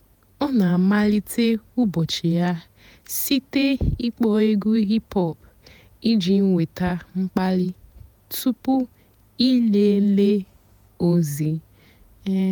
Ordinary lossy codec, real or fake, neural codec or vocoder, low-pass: none; fake; vocoder, 48 kHz, 128 mel bands, Vocos; none